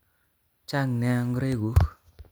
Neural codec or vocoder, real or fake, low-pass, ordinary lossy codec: none; real; none; none